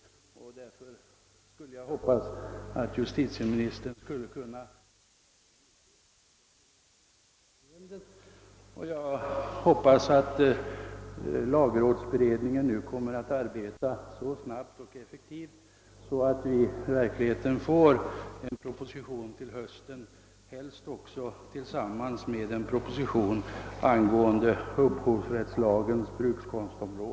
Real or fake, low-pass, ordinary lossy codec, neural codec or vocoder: real; none; none; none